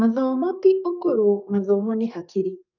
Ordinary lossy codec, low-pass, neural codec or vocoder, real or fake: none; 7.2 kHz; codec, 32 kHz, 1.9 kbps, SNAC; fake